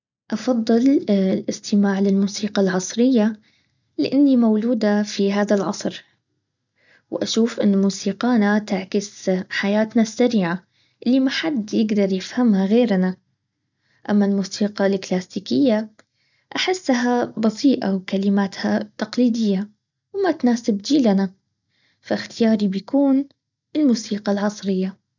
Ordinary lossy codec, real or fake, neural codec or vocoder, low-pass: none; real; none; 7.2 kHz